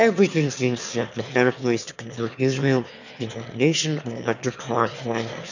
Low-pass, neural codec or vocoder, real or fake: 7.2 kHz; autoencoder, 22.05 kHz, a latent of 192 numbers a frame, VITS, trained on one speaker; fake